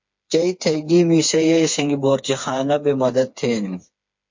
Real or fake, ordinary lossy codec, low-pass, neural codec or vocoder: fake; MP3, 48 kbps; 7.2 kHz; codec, 16 kHz, 4 kbps, FreqCodec, smaller model